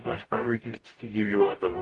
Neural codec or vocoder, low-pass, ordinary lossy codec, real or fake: codec, 44.1 kHz, 0.9 kbps, DAC; 10.8 kHz; Opus, 24 kbps; fake